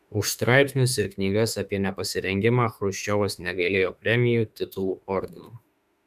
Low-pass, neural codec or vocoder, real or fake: 14.4 kHz; autoencoder, 48 kHz, 32 numbers a frame, DAC-VAE, trained on Japanese speech; fake